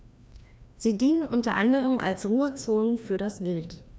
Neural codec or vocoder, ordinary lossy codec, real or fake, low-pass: codec, 16 kHz, 1 kbps, FreqCodec, larger model; none; fake; none